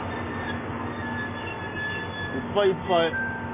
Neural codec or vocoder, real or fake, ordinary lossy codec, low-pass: none; real; AAC, 16 kbps; 3.6 kHz